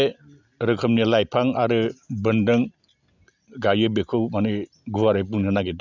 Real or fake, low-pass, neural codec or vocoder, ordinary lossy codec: real; 7.2 kHz; none; none